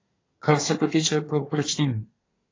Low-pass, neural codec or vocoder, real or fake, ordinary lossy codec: 7.2 kHz; codec, 24 kHz, 1 kbps, SNAC; fake; AAC, 32 kbps